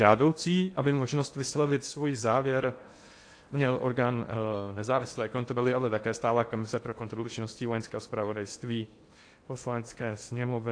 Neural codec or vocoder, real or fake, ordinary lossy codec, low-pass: codec, 16 kHz in and 24 kHz out, 0.8 kbps, FocalCodec, streaming, 65536 codes; fake; AAC, 48 kbps; 9.9 kHz